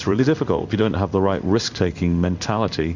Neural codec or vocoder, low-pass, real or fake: codec, 16 kHz in and 24 kHz out, 1 kbps, XY-Tokenizer; 7.2 kHz; fake